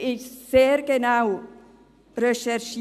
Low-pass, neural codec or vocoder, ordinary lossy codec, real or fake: 14.4 kHz; none; none; real